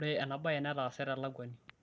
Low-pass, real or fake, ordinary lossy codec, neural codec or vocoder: none; real; none; none